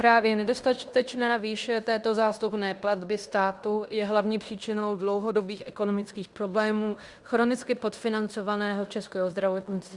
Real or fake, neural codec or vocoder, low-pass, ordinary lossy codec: fake; codec, 16 kHz in and 24 kHz out, 0.9 kbps, LongCat-Audio-Codec, fine tuned four codebook decoder; 10.8 kHz; Opus, 64 kbps